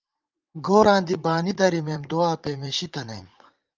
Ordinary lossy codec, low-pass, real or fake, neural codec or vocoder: Opus, 32 kbps; 7.2 kHz; fake; vocoder, 44.1 kHz, 80 mel bands, Vocos